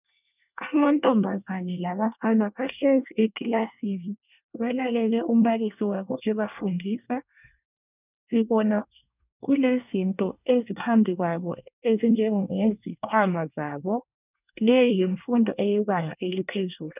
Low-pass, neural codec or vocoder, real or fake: 3.6 kHz; codec, 24 kHz, 1 kbps, SNAC; fake